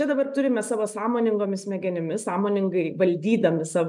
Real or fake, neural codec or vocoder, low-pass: real; none; 10.8 kHz